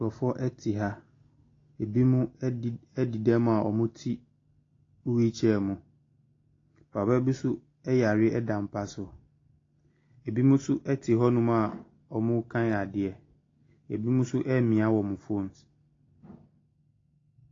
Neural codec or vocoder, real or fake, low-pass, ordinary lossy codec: none; real; 7.2 kHz; AAC, 32 kbps